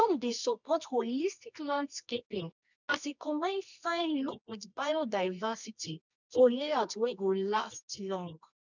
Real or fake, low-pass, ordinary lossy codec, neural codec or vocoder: fake; 7.2 kHz; none; codec, 24 kHz, 0.9 kbps, WavTokenizer, medium music audio release